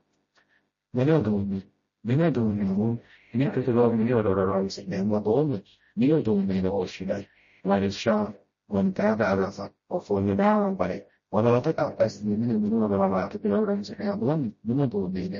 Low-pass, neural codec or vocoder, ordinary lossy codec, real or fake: 7.2 kHz; codec, 16 kHz, 0.5 kbps, FreqCodec, smaller model; MP3, 32 kbps; fake